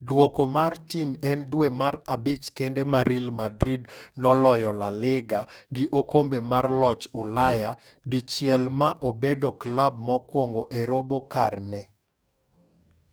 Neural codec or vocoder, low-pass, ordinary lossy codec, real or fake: codec, 44.1 kHz, 2.6 kbps, DAC; none; none; fake